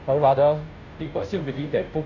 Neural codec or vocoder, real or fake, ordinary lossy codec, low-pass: codec, 16 kHz, 0.5 kbps, FunCodec, trained on Chinese and English, 25 frames a second; fake; none; 7.2 kHz